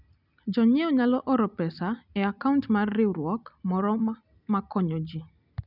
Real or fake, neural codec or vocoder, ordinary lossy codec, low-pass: real; none; none; 5.4 kHz